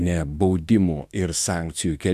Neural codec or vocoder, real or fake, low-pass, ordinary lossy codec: autoencoder, 48 kHz, 32 numbers a frame, DAC-VAE, trained on Japanese speech; fake; 14.4 kHz; Opus, 64 kbps